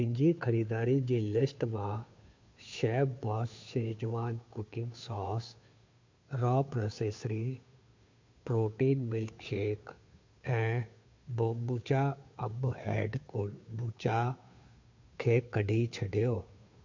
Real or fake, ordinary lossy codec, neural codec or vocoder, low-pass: fake; MP3, 48 kbps; codec, 16 kHz, 2 kbps, FunCodec, trained on Chinese and English, 25 frames a second; 7.2 kHz